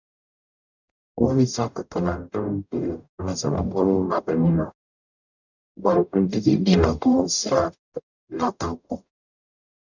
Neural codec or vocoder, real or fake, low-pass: codec, 44.1 kHz, 0.9 kbps, DAC; fake; 7.2 kHz